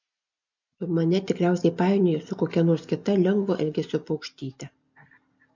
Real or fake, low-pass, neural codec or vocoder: real; 7.2 kHz; none